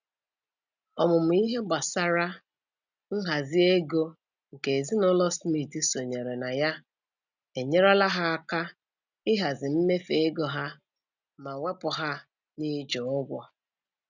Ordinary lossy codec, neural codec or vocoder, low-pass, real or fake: none; none; 7.2 kHz; real